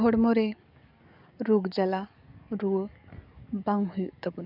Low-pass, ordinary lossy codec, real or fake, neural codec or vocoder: 5.4 kHz; none; fake; codec, 44.1 kHz, 7.8 kbps, DAC